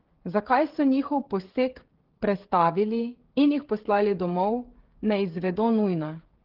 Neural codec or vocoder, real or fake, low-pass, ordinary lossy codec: codec, 16 kHz, 16 kbps, FreqCodec, smaller model; fake; 5.4 kHz; Opus, 16 kbps